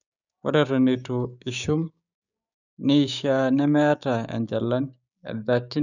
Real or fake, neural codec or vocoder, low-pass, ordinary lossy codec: fake; codec, 16 kHz, 6 kbps, DAC; 7.2 kHz; none